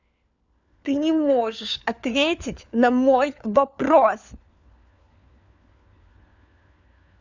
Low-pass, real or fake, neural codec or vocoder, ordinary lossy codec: 7.2 kHz; fake; codec, 16 kHz, 4 kbps, FunCodec, trained on LibriTTS, 50 frames a second; none